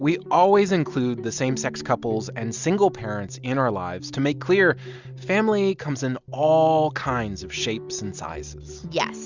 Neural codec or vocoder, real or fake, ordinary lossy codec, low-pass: none; real; Opus, 64 kbps; 7.2 kHz